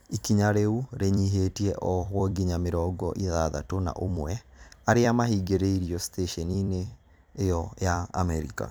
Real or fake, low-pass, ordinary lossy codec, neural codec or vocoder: fake; none; none; vocoder, 44.1 kHz, 128 mel bands every 256 samples, BigVGAN v2